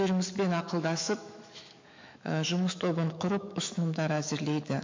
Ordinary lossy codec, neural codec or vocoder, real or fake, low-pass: MP3, 48 kbps; autoencoder, 48 kHz, 128 numbers a frame, DAC-VAE, trained on Japanese speech; fake; 7.2 kHz